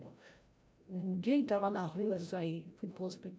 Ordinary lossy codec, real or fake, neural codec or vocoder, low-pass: none; fake; codec, 16 kHz, 0.5 kbps, FreqCodec, larger model; none